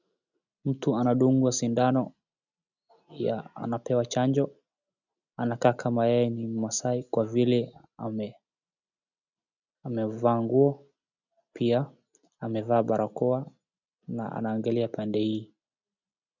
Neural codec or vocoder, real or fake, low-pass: none; real; 7.2 kHz